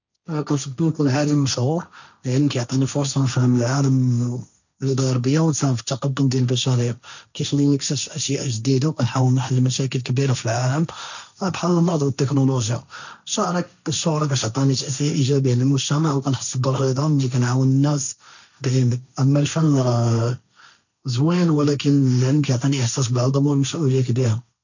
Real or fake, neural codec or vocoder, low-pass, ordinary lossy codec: fake; codec, 16 kHz, 1.1 kbps, Voila-Tokenizer; 7.2 kHz; none